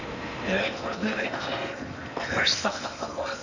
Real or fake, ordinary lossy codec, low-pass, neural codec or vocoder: fake; none; 7.2 kHz; codec, 16 kHz in and 24 kHz out, 0.8 kbps, FocalCodec, streaming, 65536 codes